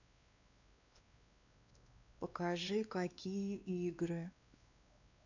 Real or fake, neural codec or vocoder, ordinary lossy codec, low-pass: fake; codec, 16 kHz, 2 kbps, X-Codec, WavLM features, trained on Multilingual LibriSpeech; none; 7.2 kHz